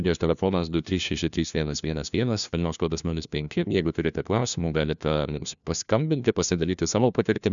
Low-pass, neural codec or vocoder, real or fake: 7.2 kHz; codec, 16 kHz, 1 kbps, FunCodec, trained on LibriTTS, 50 frames a second; fake